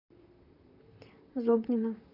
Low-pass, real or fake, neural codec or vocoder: 5.4 kHz; fake; vocoder, 44.1 kHz, 128 mel bands, Pupu-Vocoder